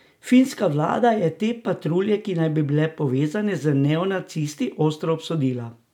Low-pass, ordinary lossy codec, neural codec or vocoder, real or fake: 19.8 kHz; none; none; real